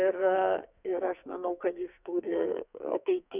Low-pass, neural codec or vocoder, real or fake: 3.6 kHz; codec, 44.1 kHz, 2.6 kbps, SNAC; fake